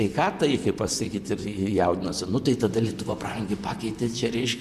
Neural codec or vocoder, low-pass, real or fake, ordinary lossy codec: vocoder, 44.1 kHz, 128 mel bands, Pupu-Vocoder; 14.4 kHz; fake; MP3, 96 kbps